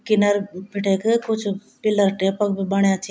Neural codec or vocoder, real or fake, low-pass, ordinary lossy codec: none; real; none; none